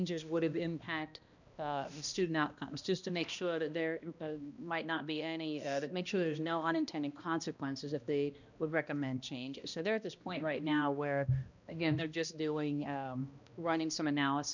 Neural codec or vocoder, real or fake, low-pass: codec, 16 kHz, 1 kbps, X-Codec, HuBERT features, trained on balanced general audio; fake; 7.2 kHz